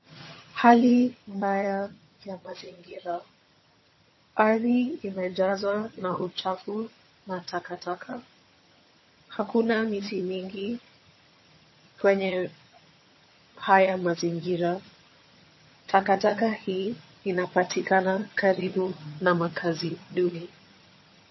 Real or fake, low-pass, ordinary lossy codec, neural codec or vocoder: fake; 7.2 kHz; MP3, 24 kbps; vocoder, 22.05 kHz, 80 mel bands, HiFi-GAN